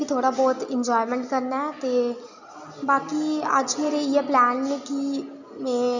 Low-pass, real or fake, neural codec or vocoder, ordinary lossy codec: 7.2 kHz; real; none; none